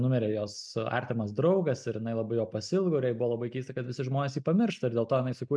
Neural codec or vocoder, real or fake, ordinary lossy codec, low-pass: none; real; MP3, 96 kbps; 9.9 kHz